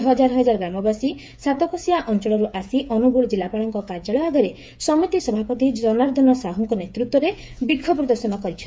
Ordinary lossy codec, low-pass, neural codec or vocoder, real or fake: none; none; codec, 16 kHz, 8 kbps, FreqCodec, smaller model; fake